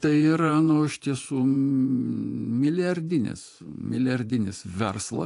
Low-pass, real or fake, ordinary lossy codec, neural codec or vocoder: 10.8 kHz; fake; AAC, 64 kbps; vocoder, 24 kHz, 100 mel bands, Vocos